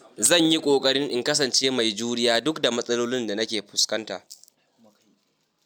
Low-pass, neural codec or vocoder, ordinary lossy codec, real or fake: none; none; none; real